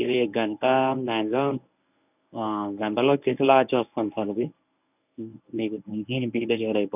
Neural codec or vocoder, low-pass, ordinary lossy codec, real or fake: codec, 24 kHz, 0.9 kbps, WavTokenizer, medium speech release version 1; 3.6 kHz; none; fake